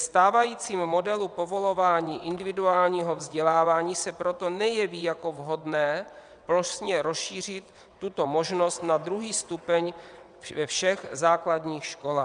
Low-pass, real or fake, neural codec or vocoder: 9.9 kHz; fake; vocoder, 22.05 kHz, 80 mel bands, WaveNeXt